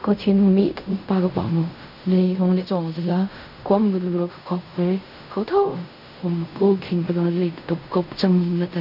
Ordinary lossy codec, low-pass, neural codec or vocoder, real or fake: none; 5.4 kHz; codec, 16 kHz in and 24 kHz out, 0.4 kbps, LongCat-Audio-Codec, fine tuned four codebook decoder; fake